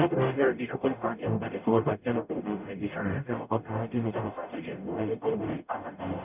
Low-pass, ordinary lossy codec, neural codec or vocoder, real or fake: 3.6 kHz; none; codec, 44.1 kHz, 0.9 kbps, DAC; fake